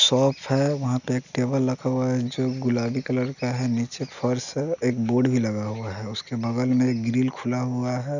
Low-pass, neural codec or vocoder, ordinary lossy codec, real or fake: 7.2 kHz; none; none; real